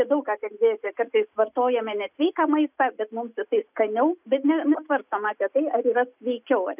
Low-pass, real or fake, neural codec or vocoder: 3.6 kHz; real; none